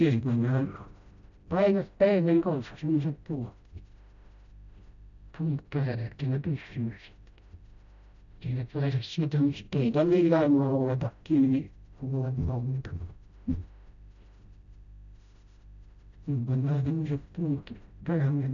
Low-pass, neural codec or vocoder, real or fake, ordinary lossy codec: 7.2 kHz; codec, 16 kHz, 0.5 kbps, FreqCodec, smaller model; fake; none